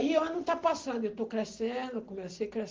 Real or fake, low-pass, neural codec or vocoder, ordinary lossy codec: real; 7.2 kHz; none; Opus, 16 kbps